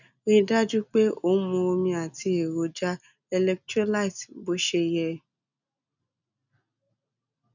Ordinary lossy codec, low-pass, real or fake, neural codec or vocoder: none; 7.2 kHz; real; none